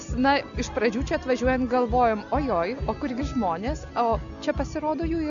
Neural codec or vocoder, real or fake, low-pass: none; real; 7.2 kHz